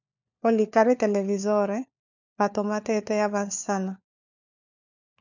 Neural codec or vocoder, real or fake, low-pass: codec, 16 kHz, 4 kbps, FunCodec, trained on LibriTTS, 50 frames a second; fake; 7.2 kHz